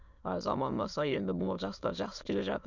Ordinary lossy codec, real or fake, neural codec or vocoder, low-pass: none; fake; autoencoder, 22.05 kHz, a latent of 192 numbers a frame, VITS, trained on many speakers; 7.2 kHz